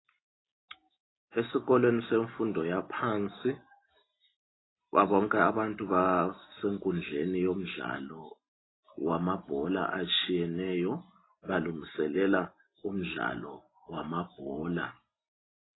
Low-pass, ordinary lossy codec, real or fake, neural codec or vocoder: 7.2 kHz; AAC, 16 kbps; real; none